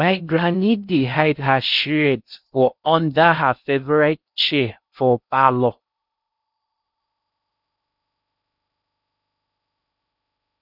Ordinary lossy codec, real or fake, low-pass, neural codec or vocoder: none; fake; 5.4 kHz; codec, 16 kHz in and 24 kHz out, 0.6 kbps, FocalCodec, streaming, 4096 codes